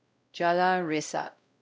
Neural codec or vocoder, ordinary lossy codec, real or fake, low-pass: codec, 16 kHz, 0.5 kbps, X-Codec, WavLM features, trained on Multilingual LibriSpeech; none; fake; none